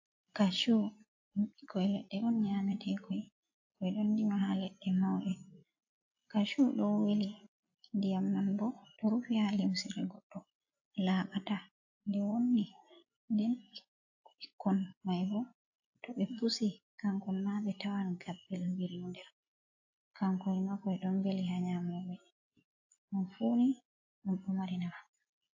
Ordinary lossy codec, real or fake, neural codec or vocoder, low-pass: AAC, 48 kbps; real; none; 7.2 kHz